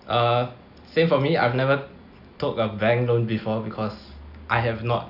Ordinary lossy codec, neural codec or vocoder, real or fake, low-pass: none; none; real; 5.4 kHz